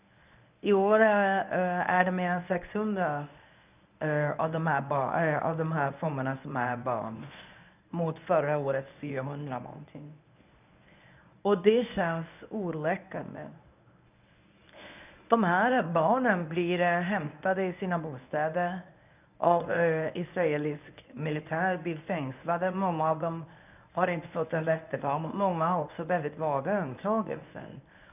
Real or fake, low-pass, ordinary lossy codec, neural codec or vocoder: fake; 3.6 kHz; none; codec, 24 kHz, 0.9 kbps, WavTokenizer, medium speech release version 1